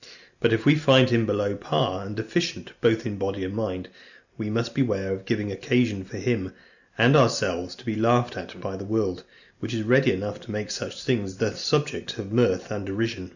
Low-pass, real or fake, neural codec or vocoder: 7.2 kHz; real; none